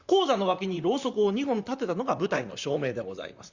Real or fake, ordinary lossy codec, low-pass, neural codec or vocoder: fake; Opus, 64 kbps; 7.2 kHz; vocoder, 44.1 kHz, 128 mel bands, Pupu-Vocoder